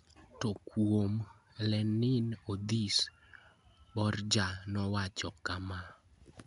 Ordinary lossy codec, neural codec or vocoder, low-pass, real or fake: none; none; 10.8 kHz; real